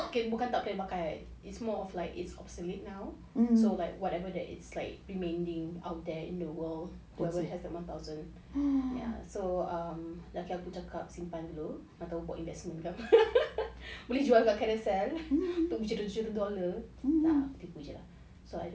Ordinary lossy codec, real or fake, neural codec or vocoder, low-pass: none; real; none; none